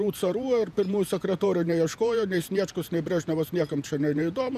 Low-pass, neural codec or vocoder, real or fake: 14.4 kHz; vocoder, 48 kHz, 128 mel bands, Vocos; fake